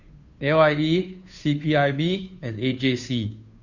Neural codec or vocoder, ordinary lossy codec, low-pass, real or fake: codec, 16 kHz, 2 kbps, FunCodec, trained on Chinese and English, 25 frames a second; none; 7.2 kHz; fake